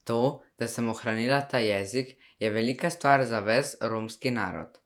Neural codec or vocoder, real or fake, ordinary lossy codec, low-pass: vocoder, 48 kHz, 128 mel bands, Vocos; fake; none; 19.8 kHz